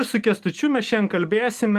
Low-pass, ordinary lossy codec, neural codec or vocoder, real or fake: 14.4 kHz; Opus, 24 kbps; none; real